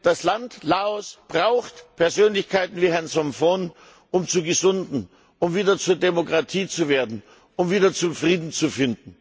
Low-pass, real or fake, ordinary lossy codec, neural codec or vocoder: none; real; none; none